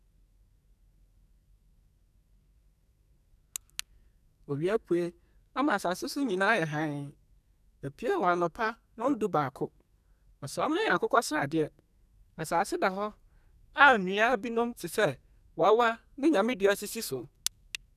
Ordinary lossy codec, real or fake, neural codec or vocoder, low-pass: none; fake; codec, 44.1 kHz, 2.6 kbps, SNAC; 14.4 kHz